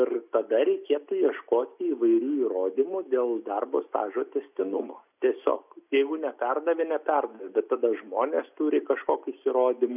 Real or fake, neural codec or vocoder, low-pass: real; none; 3.6 kHz